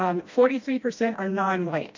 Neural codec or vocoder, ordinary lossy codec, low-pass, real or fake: codec, 16 kHz, 1 kbps, FreqCodec, smaller model; MP3, 48 kbps; 7.2 kHz; fake